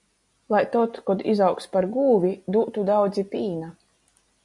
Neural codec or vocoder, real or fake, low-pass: none; real; 10.8 kHz